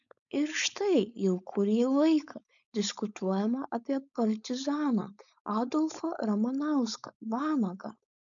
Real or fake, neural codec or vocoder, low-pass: fake; codec, 16 kHz, 4.8 kbps, FACodec; 7.2 kHz